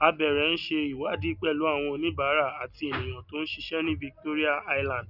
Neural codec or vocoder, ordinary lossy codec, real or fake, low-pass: none; none; real; 5.4 kHz